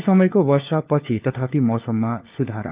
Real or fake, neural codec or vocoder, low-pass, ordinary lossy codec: fake; codec, 16 kHz, 4 kbps, FunCodec, trained on LibriTTS, 50 frames a second; 3.6 kHz; Opus, 32 kbps